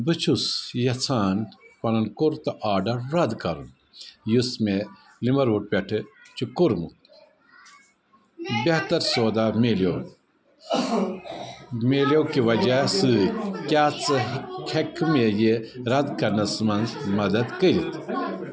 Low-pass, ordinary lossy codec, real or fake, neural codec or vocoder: none; none; real; none